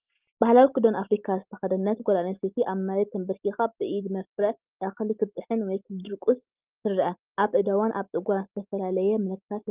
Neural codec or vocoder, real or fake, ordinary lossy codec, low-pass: none; real; Opus, 32 kbps; 3.6 kHz